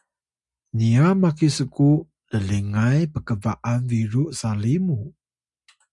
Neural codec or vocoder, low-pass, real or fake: none; 10.8 kHz; real